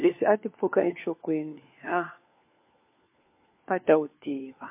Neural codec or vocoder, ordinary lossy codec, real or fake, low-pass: codec, 16 kHz, 4 kbps, FunCodec, trained on LibriTTS, 50 frames a second; MP3, 24 kbps; fake; 3.6 kHz